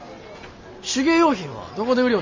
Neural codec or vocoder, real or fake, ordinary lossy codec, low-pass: none; real; MP3, 32 kbps; 7.2 kHz